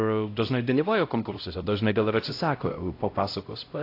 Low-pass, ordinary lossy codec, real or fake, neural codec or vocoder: 5.4 kHz; AAC, 32 kbps; fake; codec, 16 kHz, 0.5 kbps, X-Codec, HuBERT features, trained on LibriSpeech